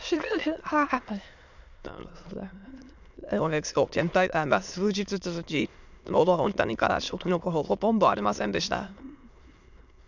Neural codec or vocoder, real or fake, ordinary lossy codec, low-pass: autoencoder, 22.05 kHz, a latent of 192 numbers a frame, VITS, trained on many speakers; fake; none; 7.2 kHz